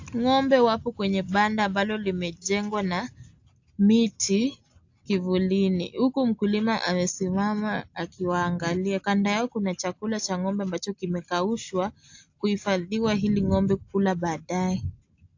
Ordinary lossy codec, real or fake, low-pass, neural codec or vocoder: AAC, 48 kbps; real; 7.2 kHz; none